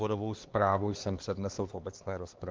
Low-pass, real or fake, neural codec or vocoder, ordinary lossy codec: 7.2 kHz; fake; codec, 16 kHz, 2 kbps, X-Codec, HuBERT features, trained on LibriSpeech; Opus, 16 kbps